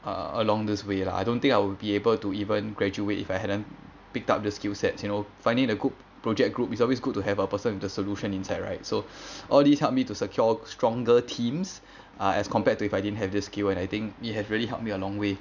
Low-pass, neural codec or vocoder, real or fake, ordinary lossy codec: 7.2 kHz; none; real; none